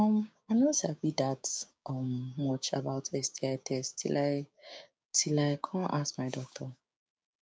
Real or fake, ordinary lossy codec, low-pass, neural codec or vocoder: fake; none; none; codec, 16 kHz, 6 kbps, DAC